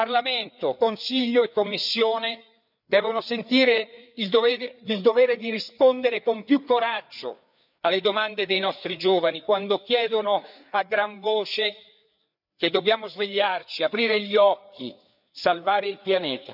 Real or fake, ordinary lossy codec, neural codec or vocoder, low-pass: fake; none; codec, 16 kHz, 4 kbps, FreqCodec, larger model; 5.4 kHz